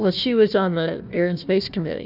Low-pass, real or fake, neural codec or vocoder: 5.4 kHz; fake; codec, 16 kHz, 1 kbps, FunCodec, trained on Chinese and English, 50 frames a second